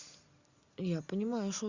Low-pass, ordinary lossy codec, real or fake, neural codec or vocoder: 7.2 kHz; Opus, 64 kbps; real; none